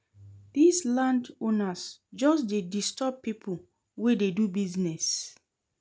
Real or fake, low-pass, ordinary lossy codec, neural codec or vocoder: real; none; none; none